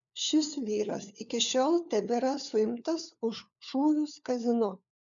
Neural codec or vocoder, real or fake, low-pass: codec, 16 kHz, 4 kbps, FunCodec, trained on LibriTTS, 50 frames a second; fake; 7.2 kHz